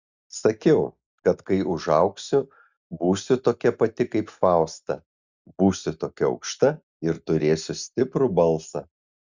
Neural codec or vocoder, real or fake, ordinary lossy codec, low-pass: none; real; Opus, 64 kbps; 7.2 kHz